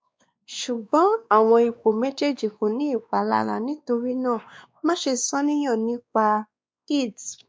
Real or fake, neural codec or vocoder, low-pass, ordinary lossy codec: fake; codec, 16 kHz, 2 kbps, X-Codec, WavLM features, trained on Multilingual LibriSpeech; none; none